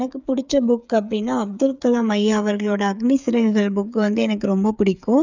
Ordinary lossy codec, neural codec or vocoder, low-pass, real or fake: none; codec, 16 kHz, 8 kbps, FreqCodec, smaller model; 7.2 kHz; fake